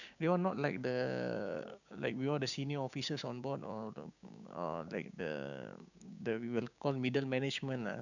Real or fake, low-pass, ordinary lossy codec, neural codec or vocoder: fake; 7.2 kHz; none; codec, 16 kHz, 6 kbps, DAC